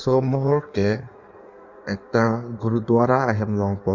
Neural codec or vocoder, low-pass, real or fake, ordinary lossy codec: codec, 16 kHz in and 24 kHz out, 1.1 kbps, FireRedTTS-2 codec; 7.2 kHz; fake; none